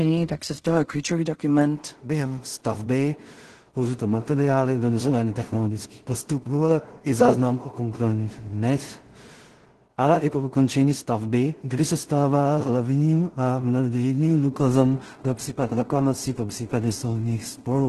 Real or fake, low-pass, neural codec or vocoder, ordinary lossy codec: fake; 10.8 kHz; codec, 16 kHz in and 24 kHz out, 0.4 kbps, LongCat-Audio-Codec, two codebook decoder; Opus, 16 kbps